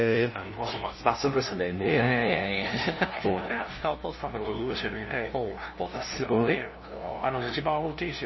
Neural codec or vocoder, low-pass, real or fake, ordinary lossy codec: codec, 16 kHz, 0.5 kbps, FunCodec, trained on LibriTTS, 25 frames a second; 7.2 kHz; fake; MP3, 24 kbps